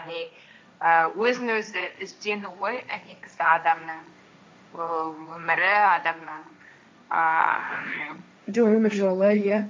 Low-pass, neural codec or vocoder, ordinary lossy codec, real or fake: none; codec, 16 kHz, 1.1 kbps, Voila-Tokenizer; none; fake